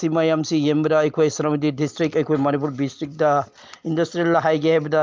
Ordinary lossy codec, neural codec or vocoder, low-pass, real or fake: Opus, 24 kbps; none; 7.2 kHz; real